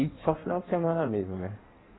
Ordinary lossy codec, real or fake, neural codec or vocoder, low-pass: AAC, 16 kbps; fake; codec, 16 kHz in and 24 kHz out, 1.1 kbps, FireRedTTS-2 codec; 7.2 kHz